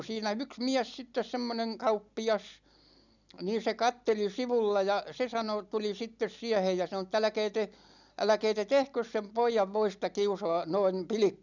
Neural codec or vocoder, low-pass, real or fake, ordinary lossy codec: none; 7.2 kHz; real; none